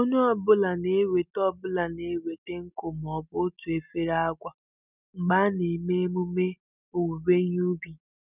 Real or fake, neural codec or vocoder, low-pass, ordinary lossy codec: real; none; 3.6 kHz; none